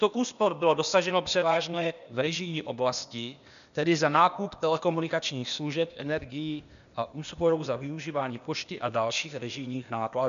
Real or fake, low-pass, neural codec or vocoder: fake; 7.2 kHz; codec, 16 kHz, 0.8 kbps, ZipCodec